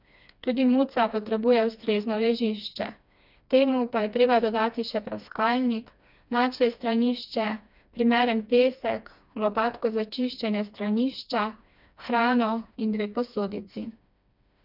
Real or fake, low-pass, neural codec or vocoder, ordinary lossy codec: fake; 5.4 kHz; codec, 16 kHz, 2 kbps, FreqCodec, smaller model; none